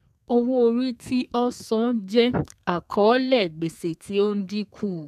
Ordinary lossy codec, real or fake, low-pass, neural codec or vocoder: none; fake; 14.4 kHz; codec, 32 kHz, 1.9 kbps, SNAC